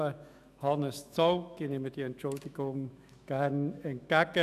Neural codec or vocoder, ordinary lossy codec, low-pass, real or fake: autoencoder, 48 kHz, 128 numbers a frame, DAC-VAE, trained on Japanese speech; none; 14.4 kHz; fake